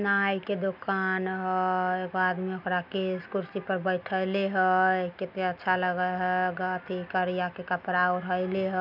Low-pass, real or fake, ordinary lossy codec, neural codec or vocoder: 5.4 kHz; real; none; none